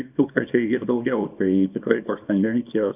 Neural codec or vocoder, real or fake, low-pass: codec, 24 kHz, 0.9 kbps, WavTokenizer, small release; fake; 3.6 kHz